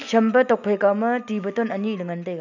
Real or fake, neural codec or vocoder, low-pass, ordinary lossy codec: real; none; 7.2 kHz; none